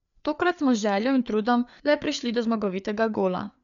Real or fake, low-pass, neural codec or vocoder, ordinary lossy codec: fake; 7.2 kHz; codec, 16 kHz, 4 kbps, FreqCodec, larger model; none